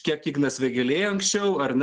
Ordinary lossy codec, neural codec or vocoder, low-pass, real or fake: Opus, 16 kbps; none; 10.8 kHz; real